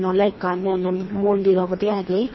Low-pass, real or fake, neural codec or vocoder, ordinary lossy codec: 7.2 kHz; fake; codec, 24 kHz, 1.5 kbps, HILCodec; MP3, 24 kbps